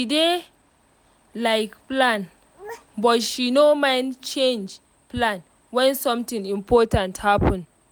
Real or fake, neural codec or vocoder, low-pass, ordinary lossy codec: real; none; none; none